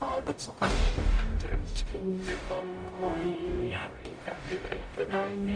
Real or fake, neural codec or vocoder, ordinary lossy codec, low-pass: fake; codec, 44.1 kHz, 0.9 kbps, DAC; Opus, 64 kbps; 9.9 kHz